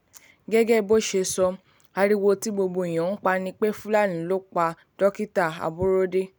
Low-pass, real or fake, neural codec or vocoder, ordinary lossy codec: none; real; none; none